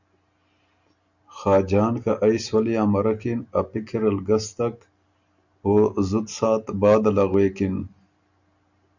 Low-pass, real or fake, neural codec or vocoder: 7.2 kHz; real; none